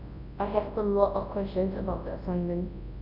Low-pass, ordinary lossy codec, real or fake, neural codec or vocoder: 5.4 kHz; none; fake; codec, 24 kHz, 0.9 kbps, WavTokenizer, large speech release